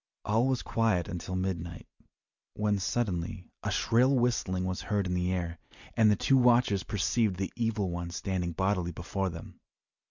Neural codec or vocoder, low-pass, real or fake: none; 7.2 kHz; real